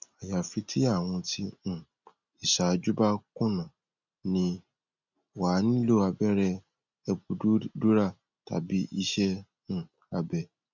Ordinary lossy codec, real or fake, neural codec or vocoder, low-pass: none; real; none; 7.2 kHz